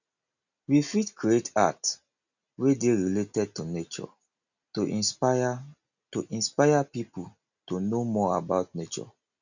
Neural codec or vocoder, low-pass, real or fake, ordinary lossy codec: none; 7.2 kHz; real; none